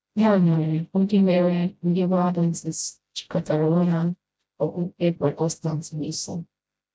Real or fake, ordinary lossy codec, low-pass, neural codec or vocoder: fake; none; none; codec, 16 kHz, 0.5 kbps, FreqCodec, smaller model